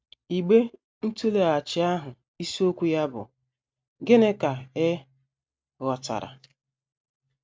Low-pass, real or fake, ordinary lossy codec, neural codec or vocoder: none; real; none; none